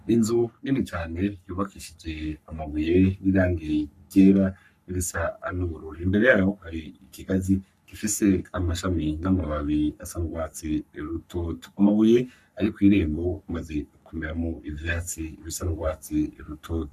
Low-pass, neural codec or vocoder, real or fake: 14.4 kHz; codec, 44.1 kHz, 3.4 kbps, Pupu-Codec; fake